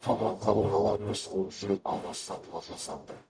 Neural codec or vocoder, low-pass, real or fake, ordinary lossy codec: codec, 44.1 kHz, 0.9 kbps, DAC; 9.9 kHz; fake; none